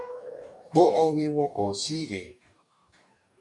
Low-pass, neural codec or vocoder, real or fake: 10.8 kHz; codec, 44.1 kHz, 2.6 kbps, DAC; fake